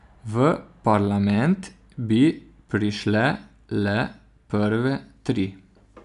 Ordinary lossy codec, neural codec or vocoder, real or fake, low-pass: none; none; real; 10.8 kHz